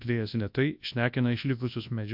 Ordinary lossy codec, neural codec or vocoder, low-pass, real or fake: MP3, 48 kbps; codec, 24 kHz, 0.9 kbps, WavTokenizer, large speech release; 5.4 kHz; fake